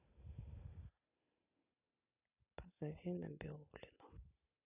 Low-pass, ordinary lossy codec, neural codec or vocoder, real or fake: 3.6 kHz; none; none; real